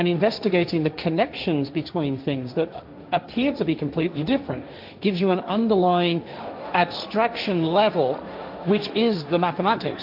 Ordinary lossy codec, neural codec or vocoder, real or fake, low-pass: AAC, 48 kbps; codec, 16 kHz, 1.1 kbps, Voila-Tokenizer; fake; 5.4 kHz